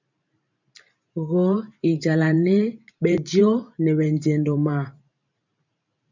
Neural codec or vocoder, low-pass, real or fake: vocoder, 44.1 kHz, 128 mel bands every 512 samples, BigVGAN v2; 7.2 kHz; fake